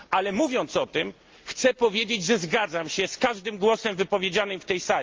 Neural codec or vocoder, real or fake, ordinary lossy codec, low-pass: none; real; Opus, 24 kbps; 7.2 kHz